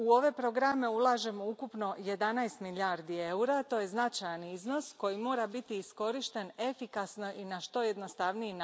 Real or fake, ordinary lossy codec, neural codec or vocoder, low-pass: real; none; none; none